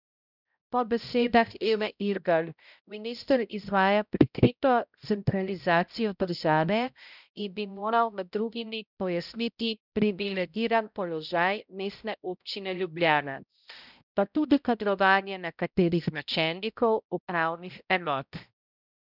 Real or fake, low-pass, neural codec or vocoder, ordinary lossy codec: fake; 5.4 kHz; codec, 16 kHz, 0.5 kbps, X-Codec, HuBERT features, trained on balanced general audio; none